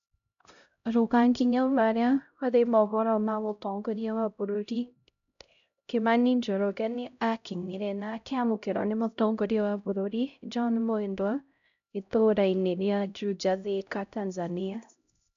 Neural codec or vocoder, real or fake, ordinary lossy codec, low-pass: codec, 16 kHz, 0.5 kbps, X-Codec, HuBERT features, trained on LibriSpeech; fake; none; 7.2 kHz